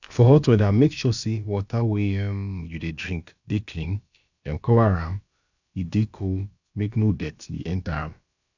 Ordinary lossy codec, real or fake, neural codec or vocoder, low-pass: none; fake; codec, 16 kHz, about 1 kbps, DyCAST, with the encoder's durations; 7.2 kHz